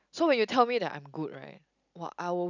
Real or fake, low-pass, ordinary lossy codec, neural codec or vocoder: real; 7.2 kHz; none; none